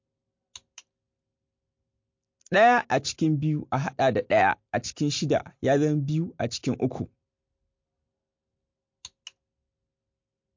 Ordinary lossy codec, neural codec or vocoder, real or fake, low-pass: MP3, 48 kbps; none; real; 7.2 kHz